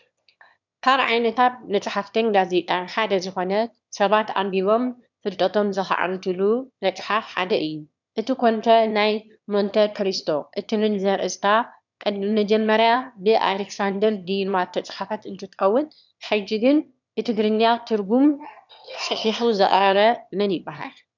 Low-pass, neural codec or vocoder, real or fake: 7.2 kHz; autoencoder, 22.05 kHz, a latent of 192 numbers a frame, VITS, trained on one speaker; fake